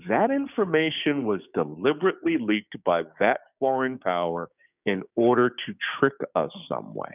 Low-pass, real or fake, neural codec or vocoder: 3.6 kHz; fake; codec, 16 kHz, 6 kbps, DAC